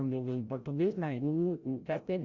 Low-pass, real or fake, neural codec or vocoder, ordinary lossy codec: 7.2 kHz; fake; codec, 16 kHz, 0.5 kbps, FreqCodec, larger model; Opus, 64 kbps